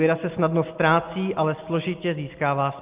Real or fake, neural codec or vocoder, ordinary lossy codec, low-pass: real; none; Opus, 32 kbps; 3.6 kHz